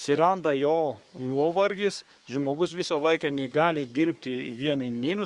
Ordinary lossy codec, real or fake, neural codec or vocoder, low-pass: Opus, 64 kbps; fake; codec, 24 kHz, 1 kbps, SNAC; 10.8 kHz